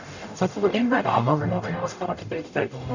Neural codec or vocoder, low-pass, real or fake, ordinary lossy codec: codec, 44.1 kHz, 0.9 kbps, DAC; 7.2 kHz; fake; none